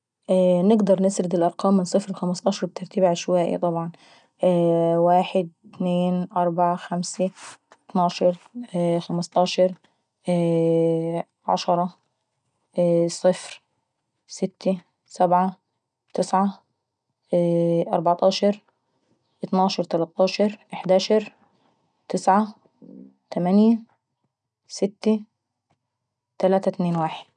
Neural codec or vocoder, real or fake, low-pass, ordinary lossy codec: none; real; 9.9 kHz; none